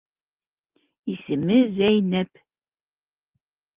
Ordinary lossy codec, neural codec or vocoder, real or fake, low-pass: Opus, 32 kbps; none; real; 3.6 kHz